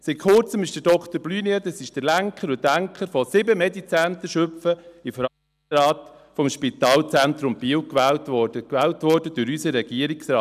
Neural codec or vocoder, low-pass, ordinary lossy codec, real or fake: none; 14.4 kHz; none; real